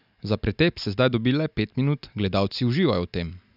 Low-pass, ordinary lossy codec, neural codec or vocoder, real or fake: 5.4 kHz; none; none; real